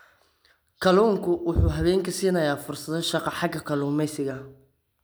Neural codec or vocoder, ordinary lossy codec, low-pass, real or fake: none; none; none; real